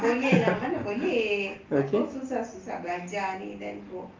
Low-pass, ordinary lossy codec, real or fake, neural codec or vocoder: 7.2 kHz; Opus, 24 kbps; real; none